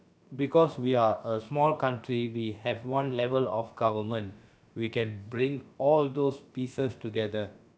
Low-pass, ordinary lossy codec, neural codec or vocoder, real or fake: none; none; codec, 16 kHz, about 1 kbps, DyCAST, with the encoder's durations; fake